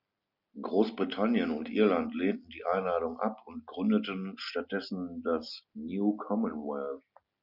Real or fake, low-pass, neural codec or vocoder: real; 5.4 kHz; none